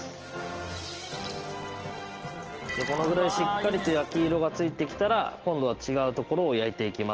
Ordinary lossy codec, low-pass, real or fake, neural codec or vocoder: Opus, 16 kbps; 7.2 kHz; real; none